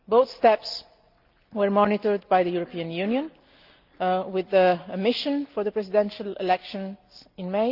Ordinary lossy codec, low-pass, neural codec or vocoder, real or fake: Opus, 24 kbps; 5.4 kHz; none; real